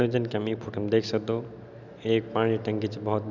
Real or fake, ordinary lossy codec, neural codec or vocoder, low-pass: real; none; none; 7.2 kHz